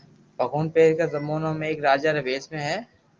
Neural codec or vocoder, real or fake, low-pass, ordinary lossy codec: none; real; 7.2 kHz; Opus, 24 kbps